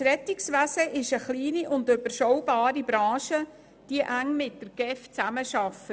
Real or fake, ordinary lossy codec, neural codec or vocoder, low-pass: real; none; none; none